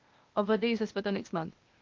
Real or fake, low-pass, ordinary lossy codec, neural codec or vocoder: fake; 7.2 kHz; Opus, 32 kbps; codec, 16 kHz, 0.8 kbps, ZipCodec